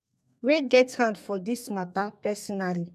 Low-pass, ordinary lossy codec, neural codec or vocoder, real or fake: 14.4 kHz; AAC, 96 kbps; codec, 32 kHz, 1.9 kbps, SNAC; fake